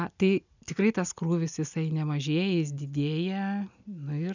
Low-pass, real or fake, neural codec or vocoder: 7.2 kHz; real; none